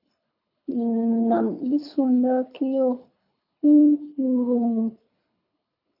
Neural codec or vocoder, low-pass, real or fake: codec, 24 kHz, 3 kbps, HILCodec; 5.4 kHz; fake